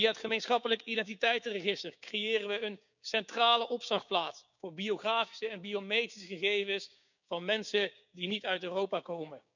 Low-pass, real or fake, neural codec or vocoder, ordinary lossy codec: 7.2 kHz; fake; codec, 16 kHz, 6 kbps, DAC; none